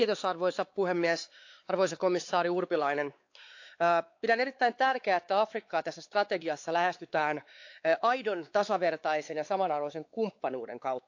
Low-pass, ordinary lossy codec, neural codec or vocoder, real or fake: 7.2 kHz; AAC, 48 kbps; codec, 16 kHz, 4 kbps, X-Codec, WavLM features, trained on Multilingual LibriSpeech; fake